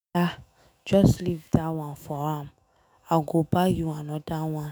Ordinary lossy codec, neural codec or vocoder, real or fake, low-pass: none; autoencoder, 48 kHz, 128 numbers a frame, DAC-VAE, trained on Japanese speech; fake; none